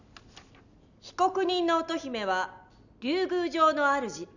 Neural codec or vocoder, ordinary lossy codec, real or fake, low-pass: none; none; real; 7.2 kHz